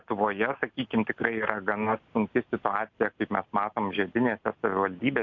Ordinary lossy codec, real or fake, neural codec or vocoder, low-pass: MP3, 64 kbps; real; none; 7.2 kHz